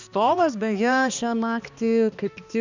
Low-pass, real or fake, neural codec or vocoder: 7.2 kHz; fake; codec, 16 kHz, 2 kbps, X-Codec, HuBERT features, trained on balanced general audio